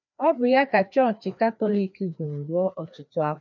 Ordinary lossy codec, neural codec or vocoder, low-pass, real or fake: none; codec, 16 kHz, 2 kbps, FreqCodec, larger model; 7.2 kHz; fake